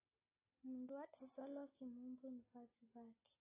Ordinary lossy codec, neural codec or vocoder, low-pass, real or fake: AAC, 16 kbps; none; 3.6 kHz; real